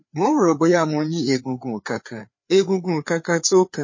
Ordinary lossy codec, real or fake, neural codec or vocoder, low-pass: MP3, 32 kbps; fake; codec, 16 kHz, 4 kbps, FreqCodec, larger model; 7.2 kHz